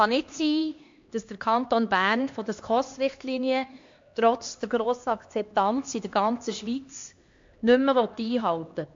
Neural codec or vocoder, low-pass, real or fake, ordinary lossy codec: codec, 16 kHz, 2 kbps, X-Codec, HuBERT features, trained on LibriSpeech; 7.2 kHz; fake; MP3, 48 kbps